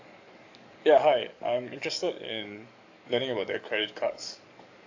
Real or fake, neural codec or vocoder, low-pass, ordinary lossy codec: fake; codec, 44.1 kHz, 7.8 kbps, DAC; 7.2 kHz; AAC, 48 kbps